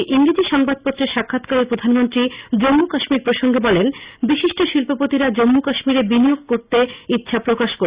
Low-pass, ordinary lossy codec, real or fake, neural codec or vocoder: 3.6 kHz; Opus, 64 kbps; real; none